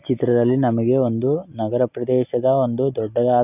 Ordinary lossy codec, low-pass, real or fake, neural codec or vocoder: none; 3.6 kHz; real; none